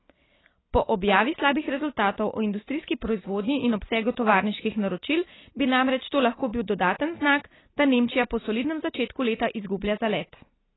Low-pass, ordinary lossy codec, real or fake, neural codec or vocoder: 7.2 kHz; AAC, 16 kbps; real; none